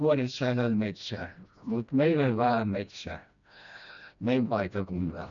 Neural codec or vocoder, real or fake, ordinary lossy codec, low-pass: codec, 16 kHz, 1 kbps, FreqCodec, smaller model; fake; none; 7.2 kHz